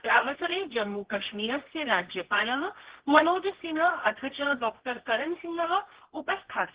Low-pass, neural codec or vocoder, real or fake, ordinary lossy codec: 3.6 kHz; codec, 24 kHz, 0.9 kbps, WavTokenizer, medium music audio release; fake; Opus, 16 kbps